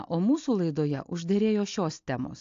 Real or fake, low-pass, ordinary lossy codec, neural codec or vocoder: real; 7.2 kHz; AAC, 48 kbps; none